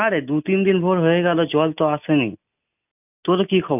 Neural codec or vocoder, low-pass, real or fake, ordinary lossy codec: none; 3.6 kHz; real; none